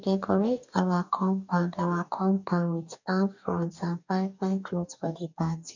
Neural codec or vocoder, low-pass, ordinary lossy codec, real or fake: codec, 44.1 kHz, 2.6 kbps, DAC; 7.2 kHz; none; fake